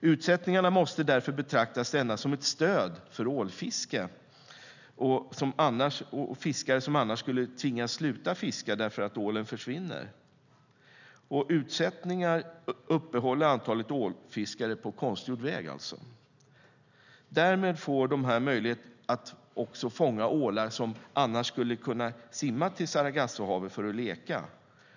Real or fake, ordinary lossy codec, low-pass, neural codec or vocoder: real; none; 7.2 kHz; none